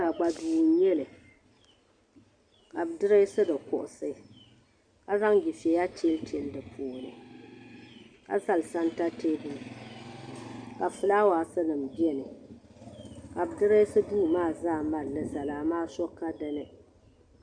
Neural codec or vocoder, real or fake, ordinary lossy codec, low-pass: none; real; Opus, 64 kbps; 9.9 kHz